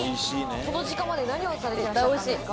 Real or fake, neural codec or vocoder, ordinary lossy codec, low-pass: real; none; none; none